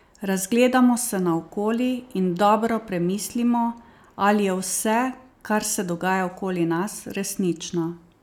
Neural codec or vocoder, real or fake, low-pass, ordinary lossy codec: none; real; 19.8 kHz; none